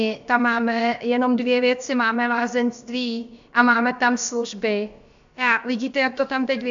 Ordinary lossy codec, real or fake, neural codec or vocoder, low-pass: AAC, 64 kbps; fake; codec, 16 kHz, about 1 kbps, DyCAST, with the encoder's durations; 7.2 kHz